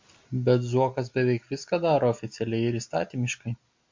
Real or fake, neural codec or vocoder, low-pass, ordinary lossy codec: real; none; 7.2 kHz; MP3, 48 kbps